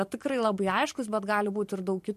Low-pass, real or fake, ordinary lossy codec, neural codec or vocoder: 14.4 kHz; fake; MP3, 64 kbps; vocoder, 44.1 kHz, 128 mel bands every 512 samples, BigVGAN v2